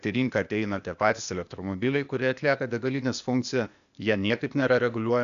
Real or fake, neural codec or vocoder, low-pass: fake; codec, 16 kHz, 0.8 kbps, ZipCodec; 7.2 kHz